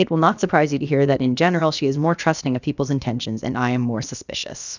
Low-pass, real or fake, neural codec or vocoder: 7.2 kHz; fake; codec, 16 kHz, about 1 kbps, DyCAST, with the encoder's durations